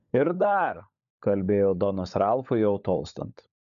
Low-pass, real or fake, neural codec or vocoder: 7.2 kHz; fake; codec, 16 kHz, 16 kbps, FunCodec, trained on LibriTTS, 50 frames a second